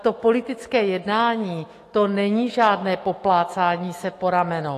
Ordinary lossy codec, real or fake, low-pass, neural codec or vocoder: AAC, 48 kbps; fake; 14.4 kHz; autoencoder, 48 kHz, 128 numbers a frame, DAC-VAE, trained on Japanese speech